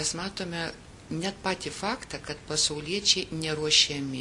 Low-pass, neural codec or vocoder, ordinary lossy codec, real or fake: 10.8 kHz; none; MP3, 48 kbps; real